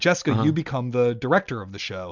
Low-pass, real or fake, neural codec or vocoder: 7.2 kHz; real; none